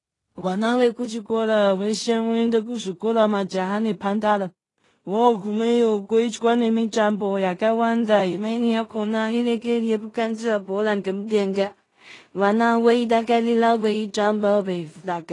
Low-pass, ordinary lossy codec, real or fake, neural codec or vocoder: 10.8 kHz; AAC, 32 kbps; fake; codec, 16 kHz in and 24 kHz out, 0.4 kbps, LongCat-Audio-Codec, two codebook decoder